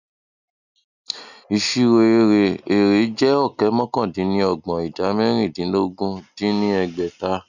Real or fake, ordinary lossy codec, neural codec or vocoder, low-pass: real; none; none; 7.2 kHz